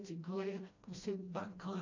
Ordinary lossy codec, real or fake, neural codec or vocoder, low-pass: AAC, 48 kbps; fake; codec, 16 kHz, 1 kbps, FreqCodec, smaller model; 7.2 kHz